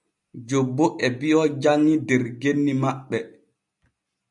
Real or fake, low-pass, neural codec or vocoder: real; 10.8 kHz; none